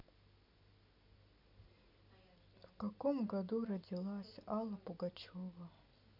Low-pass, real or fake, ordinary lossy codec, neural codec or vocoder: 5.4 kHz; real; none; none